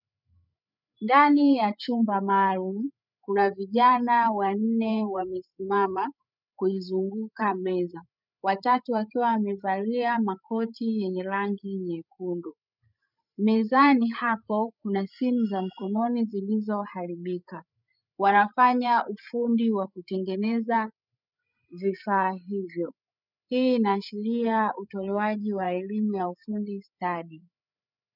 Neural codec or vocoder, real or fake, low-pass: codec, 16 kHz, 8 kbps, FreqCodec, larger model; fake; 5.4 kHz